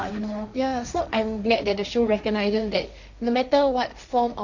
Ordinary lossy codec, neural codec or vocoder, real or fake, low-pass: none; codec, 16 kHz, 1.1 kbps, Voila-Tokenizer; fake; 7.2 kHz